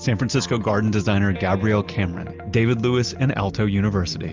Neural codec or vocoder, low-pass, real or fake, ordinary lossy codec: none; 7.2 kHz; real; Opus, 24 kbps